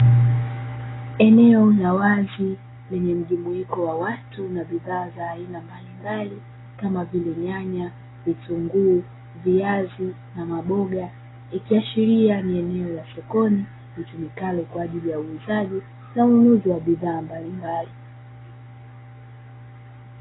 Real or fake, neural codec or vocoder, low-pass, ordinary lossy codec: real; none; 7.2 kHz; AAC, 16 kbps